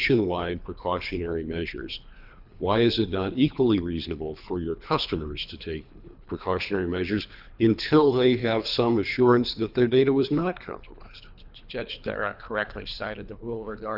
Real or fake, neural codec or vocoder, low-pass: fake; codec, 24 kHz, 3 kbps, HILCodec; 5.4 kHz